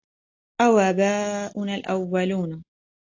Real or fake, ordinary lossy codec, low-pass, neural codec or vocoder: real; AAC, 48 kbps; 7.2 kHz; none